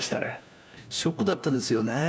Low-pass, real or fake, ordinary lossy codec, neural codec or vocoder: none; fake; none; codec, 16 kHz, 1 kbps, FunCodec, trained on LibriTTS, 50 frames a second